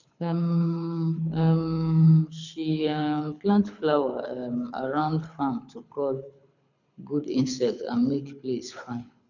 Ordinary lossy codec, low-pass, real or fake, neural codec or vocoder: none; 7.2 kHz; fake; codec, 24 kHz, 6 kbps, HILCodec